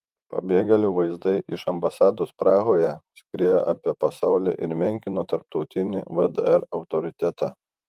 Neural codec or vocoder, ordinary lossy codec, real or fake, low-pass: vocoder, 44.1 kHz, 128 mel bands, Pupu-Vocoder; Opus, 32 kbps; fake; 14.4 kHz